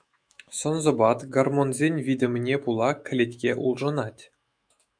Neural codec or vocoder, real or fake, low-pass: autoencoder, 48 kHz, 128 numbers a frame, DAC-VAE, trained on Japanese speech; fake; 9.9 kHz